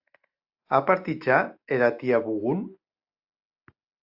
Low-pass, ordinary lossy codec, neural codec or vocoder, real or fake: 5.4 kHz; AAC, 48 kbps; none; real